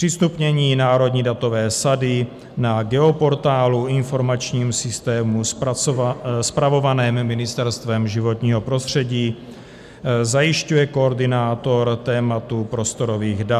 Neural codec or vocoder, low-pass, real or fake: none; 14.4 kHz; real